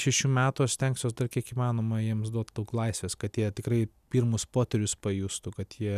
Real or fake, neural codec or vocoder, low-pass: real; none; 14.4 kHz